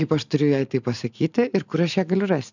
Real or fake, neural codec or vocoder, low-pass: real; none; 7.2 kHz